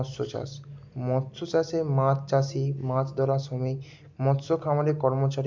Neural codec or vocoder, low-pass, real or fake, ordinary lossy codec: none; 7.2 kHz; real; MP3, 64 kbps